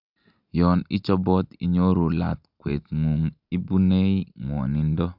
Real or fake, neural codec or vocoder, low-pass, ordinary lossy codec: real; none; 5.4 kHz; none